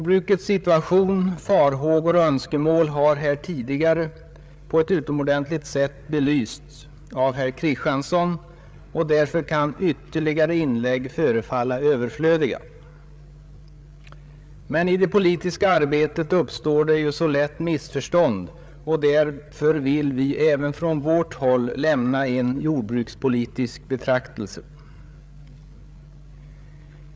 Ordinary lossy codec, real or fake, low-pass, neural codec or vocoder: none; fake; none; codec, 16 kHz, 8 kbps, FreqCodec, larger model